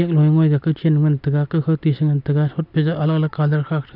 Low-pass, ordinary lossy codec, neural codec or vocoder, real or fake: 5.4 kHz; none; none; real